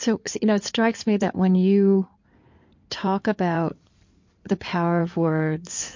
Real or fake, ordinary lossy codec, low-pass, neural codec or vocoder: fake; MP3, 48 kbps; 7.2 kHz; codec, 16 kHz in and 24 kHz out, 2.2 kbps, FireRedTTS-2 codec